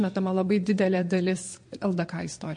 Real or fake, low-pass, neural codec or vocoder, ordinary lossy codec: fake; 9.9 kHz; vocoder, 22.05 kHz, 80 mel bands, Vocos; MP3, 48 kbps